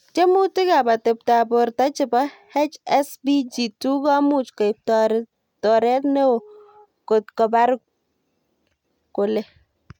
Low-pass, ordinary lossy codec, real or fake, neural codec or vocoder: 19.8 kHz; none; real; none